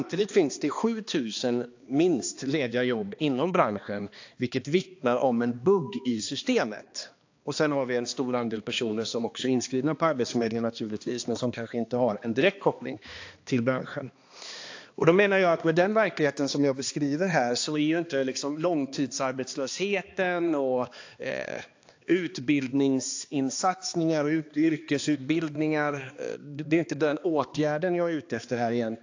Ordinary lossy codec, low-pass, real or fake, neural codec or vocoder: AAC, 48 kbps; 7.2 kHz; fake; codec, 16 kHz, 2 kbps, X-Codec, HuBERT features, trained on balanced general audio